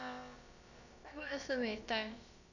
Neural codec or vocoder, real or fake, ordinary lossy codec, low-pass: codec, 16 kHz, about 1 kbps, DyCAST, with the encoder's durations; fake; none; 7.2 kHz